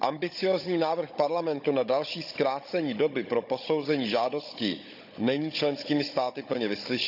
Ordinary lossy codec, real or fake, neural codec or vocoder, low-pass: none; fake; codec, 16 kHz, 16 kbps, FunCodec, trained on Chinese and English, 50 frames a second; 5.4 kHz